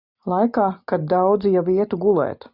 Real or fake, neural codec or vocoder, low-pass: real; none; 5.4 kHz